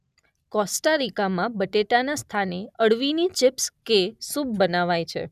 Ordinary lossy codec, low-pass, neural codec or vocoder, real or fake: none; 14.4 kHz; none; real